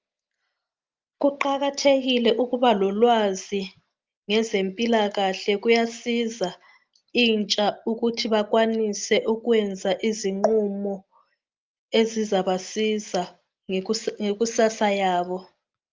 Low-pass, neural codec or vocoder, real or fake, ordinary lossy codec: 7.2 kHz; none; real; Opus, 32 kbps